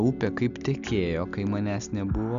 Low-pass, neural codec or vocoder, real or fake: 7.2 kHz; none; real